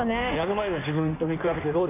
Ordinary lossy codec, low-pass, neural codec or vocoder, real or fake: none; 3.6 kHz; codec, 16 kHz in and 24 kHz out, 1.1 kbps, FireRedTTS-2 codec; fake